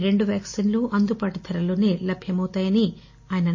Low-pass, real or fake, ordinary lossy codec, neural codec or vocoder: 7.2 kHz; real; MP3, 32 kbps; none